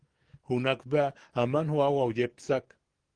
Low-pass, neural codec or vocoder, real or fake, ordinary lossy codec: 9.9 kHz; codec, 44.1 kHz, 7.8 kbps, DAC; fake; Opus, 16 kbps